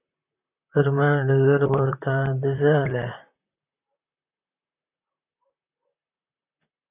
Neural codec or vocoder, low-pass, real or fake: vocoder, 44.1 kHz, 128 mel bands, Pupu-Vocoder; 3.6 kHz; fake